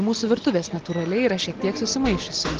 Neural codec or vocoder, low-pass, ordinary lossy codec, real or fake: none; 7.2 kHz; Opus, 16 kbps; real